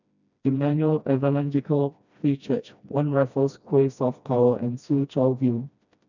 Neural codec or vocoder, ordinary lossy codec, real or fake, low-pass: codec, 16 kHz, 1 kbps, FreqCodec, smaller model; none; fake; 7.2 kHz